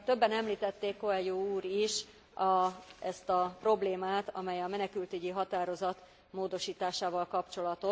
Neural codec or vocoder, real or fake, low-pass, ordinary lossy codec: none; real; none; none